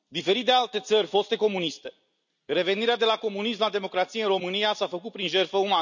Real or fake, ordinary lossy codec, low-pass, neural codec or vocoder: real; none; 7.2 kHz; none